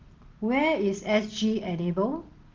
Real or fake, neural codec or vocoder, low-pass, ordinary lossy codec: real; none; 7.2 kHz; Opus, 16 kbps